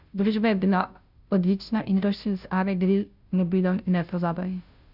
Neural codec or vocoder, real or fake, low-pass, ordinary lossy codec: codec, 16 kHz, 0.5 kbps, FunCodec, trained on Chinese and English, 25 frames a second; fake; 5.4 kHz; none